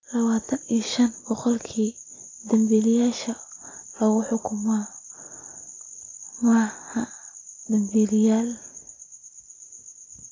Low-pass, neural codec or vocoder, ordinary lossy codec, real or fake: 7.2 kHz; none; AAC, 32 kbps; real